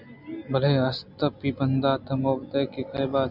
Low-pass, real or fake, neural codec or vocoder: 5.4 kHz; real; none